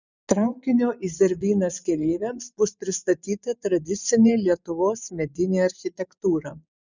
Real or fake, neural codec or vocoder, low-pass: fake; vocoder, 24 kHz, 100 mel bands, Vocos; 7.2 kHz